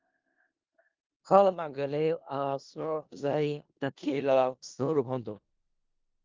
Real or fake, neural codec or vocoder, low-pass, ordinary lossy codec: fake; codec, 16 kHz in and 24 kHz out, 0.4 kbps, LongCat-Audio-Codec, four codebook decoder; 7.2 kHz; Opus, 32 kbps